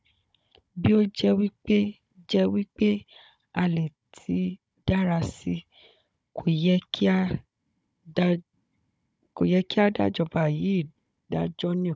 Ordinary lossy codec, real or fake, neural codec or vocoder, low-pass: none; fake; codec, 16 kHz, 16 kbps, FunCodec, trained on Chinese and English, 50 frames a second; none